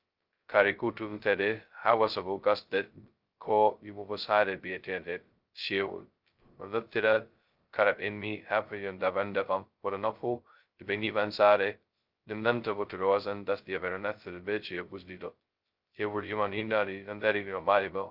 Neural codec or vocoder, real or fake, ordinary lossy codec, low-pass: codec, 16 kHz, 0.2 kbps, FocalCodec; fake; Opus, 24 kbps; 5.4 kHz